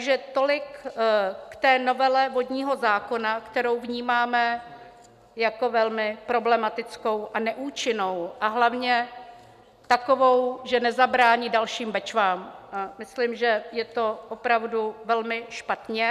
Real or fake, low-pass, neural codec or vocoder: real; 14.4 kHz; none